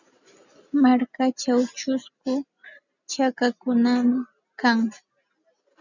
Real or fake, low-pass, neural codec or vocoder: real; 7.2 kHz; none